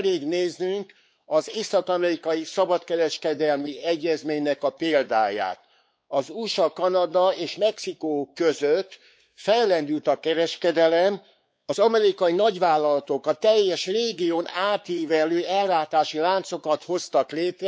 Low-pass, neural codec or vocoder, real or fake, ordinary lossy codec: none; codec, 16 kHz, 4 kbps, X-Codec, WavLM features, trained on Multilingual LibriSpeech; fake; none